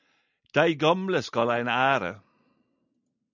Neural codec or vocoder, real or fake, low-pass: none; real; 7.2 kHz